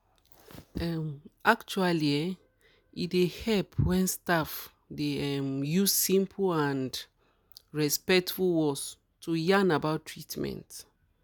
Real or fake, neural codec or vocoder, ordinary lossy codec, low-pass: real; none; none; none